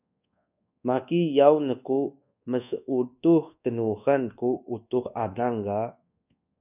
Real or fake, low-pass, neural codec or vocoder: fake; 3.6 kHz; codec, 24 kHz, 1.2 kbps, DualCodec